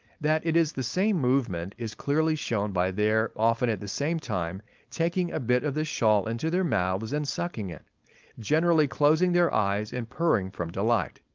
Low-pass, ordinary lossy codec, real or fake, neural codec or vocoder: 7.2 kHz; Opus, 32 kbps; fake; codec, 16 kHz, 4.8 kbps, FACodec